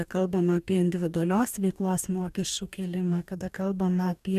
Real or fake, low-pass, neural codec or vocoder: fake; 14.4 kHz; codec, 44.1 kHz, 2.6 kbps, DAC